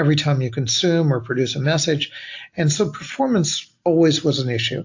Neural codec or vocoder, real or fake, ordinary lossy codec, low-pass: none; real; AAC, 48 kbps; 7.2 kHz